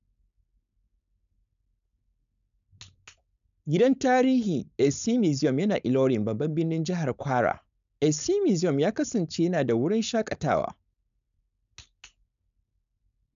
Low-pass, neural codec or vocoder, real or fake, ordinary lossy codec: 7.2 kHz; codec, 16 kHz, 4.8 kbps, FACodec; fake; MP3, 96 kbps